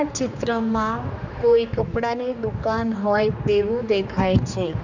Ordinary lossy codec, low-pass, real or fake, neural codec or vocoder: none; 7.2 kHz; fake; codec, 16 kHz, 2 kbps, X-Codec, HuBERT features, trained on general audio